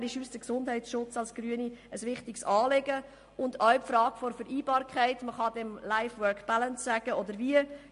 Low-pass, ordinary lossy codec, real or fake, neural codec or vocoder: 10.8 kHz; MP3, 48 kbps; real; none